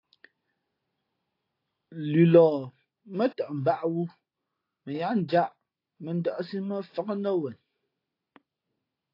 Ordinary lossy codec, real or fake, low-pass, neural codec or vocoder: AAC, 32 kbps; real; 5.4 kHz; none